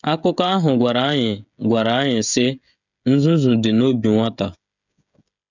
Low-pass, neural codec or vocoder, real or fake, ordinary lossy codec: 7.2 kHz; codec, 16 kHz, 16 kbps, FreqCodec, smaller model; fake; none